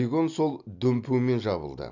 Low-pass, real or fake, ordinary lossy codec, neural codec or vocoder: 7.2 kHz; real; none; none